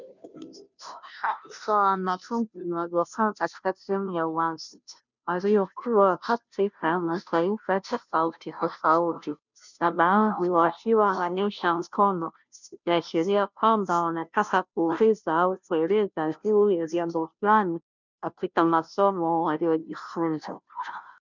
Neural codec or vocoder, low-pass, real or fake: codec, 16 kHz, 0.5 kbps, FunCodec, trained on Chinese and English, 25 frames a second; 7.2 kHz; fake